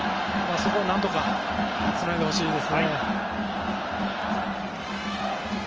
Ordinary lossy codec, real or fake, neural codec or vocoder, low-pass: Opus, 24 kbps; real; none; 7.2 kHz